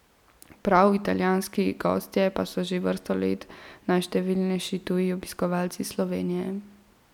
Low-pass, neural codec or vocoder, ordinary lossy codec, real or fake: 19.8 kHz; none; none; real